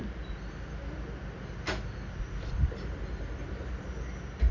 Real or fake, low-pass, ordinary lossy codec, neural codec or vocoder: real; 7.2 kHz; none; none